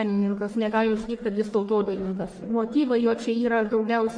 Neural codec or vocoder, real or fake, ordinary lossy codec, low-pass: codec, 44.1 kHz, 1.7 kbps, Pupu-Codec; fake; MP3, 48 kbps; 9.9 kHz